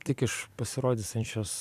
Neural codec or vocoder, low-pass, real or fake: vocoder, 44.1 kHz, 128 mel bands every 256 samples, BigVGAN v2; 14.4 kHz; fake